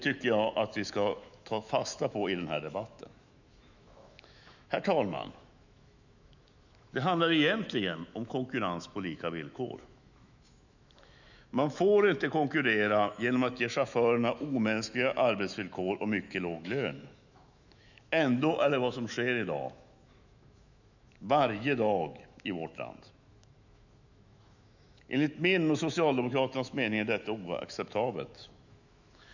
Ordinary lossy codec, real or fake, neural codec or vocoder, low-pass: none; fake; autoencoder, 48 kHz, 128 numbers a frame, DAC-VAE, trained on Japanese speech; 7.2 kHz